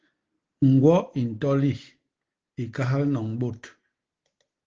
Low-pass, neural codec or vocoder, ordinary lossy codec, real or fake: 7.2 kHz; none; Opus, 16 kbps; real